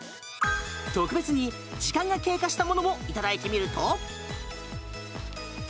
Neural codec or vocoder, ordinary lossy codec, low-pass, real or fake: none; none; none; real